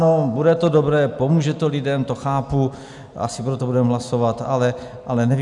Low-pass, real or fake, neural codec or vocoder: 10.8 kHz; real; none